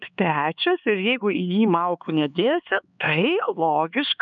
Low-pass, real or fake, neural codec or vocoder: 7.2 kHz; fake; codec, 16 kHz, 4 kbps, X-Codec, HuBERT features, trained on LibriSpeech